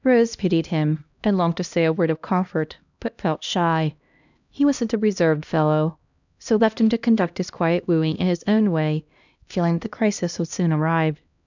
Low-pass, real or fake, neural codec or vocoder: 7.2 kHz; fake; codec, 16 kHz, 1 kbps, X-Codec, HuBERT features, trained on LibriSpeech